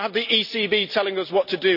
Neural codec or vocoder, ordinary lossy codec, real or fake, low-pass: none; none; real; 5.4 kHz